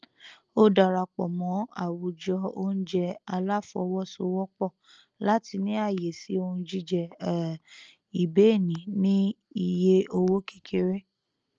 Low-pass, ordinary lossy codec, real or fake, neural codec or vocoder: 7.2 kHz; Opus, 24 kbps; real; none